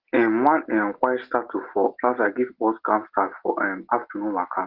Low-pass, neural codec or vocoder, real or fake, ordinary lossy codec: 5.4 kHz; none; real; Opus, 16 kbps